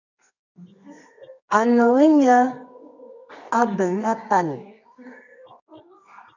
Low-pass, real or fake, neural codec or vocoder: 7.2 kHz; fake; codec, 24 kHz, 0.9 kbps, WavTokenizer, medium music audio release